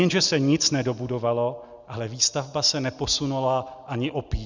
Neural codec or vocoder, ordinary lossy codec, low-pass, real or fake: none; Opus, 64 kbps; 7.2 kHz; real